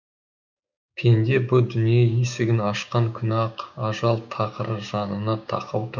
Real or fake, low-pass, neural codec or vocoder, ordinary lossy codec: fake; 7.2 kHz; codec, 16 kHz, 6 kbps, DAC; none